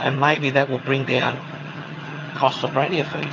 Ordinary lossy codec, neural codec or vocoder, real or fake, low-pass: AAC, 32 kbps; vocoder, 22.05 kHz, 80 mel bands, HiFi-GAN; fake; 7.2 kHz